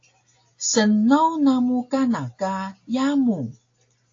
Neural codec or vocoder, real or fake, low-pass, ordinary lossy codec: none; real; 7.2 kHz; AAC, 48 kbps